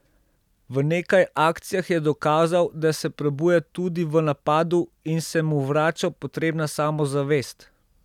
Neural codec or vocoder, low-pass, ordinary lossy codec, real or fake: none; 19.8 kHz; none; real